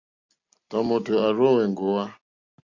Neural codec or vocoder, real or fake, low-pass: none; real; 7.2 kHz